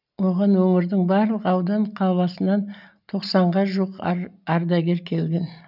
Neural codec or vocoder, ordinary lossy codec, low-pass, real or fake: none; none; 5.4 kHz; real